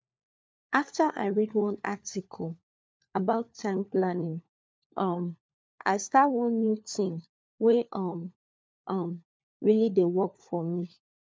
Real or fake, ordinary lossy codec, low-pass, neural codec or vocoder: fake; none; none; codec, 16 kHz, 4 kbps, FunCodec, trained on LibriTTS, 50 frames a second